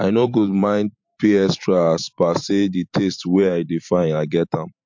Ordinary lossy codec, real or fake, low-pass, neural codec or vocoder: MP3, 48 kbps; fake; 7.2 kHz; vocoder, 44.1 kHz, 128 mel bands every 512 samples, BigVGAN v2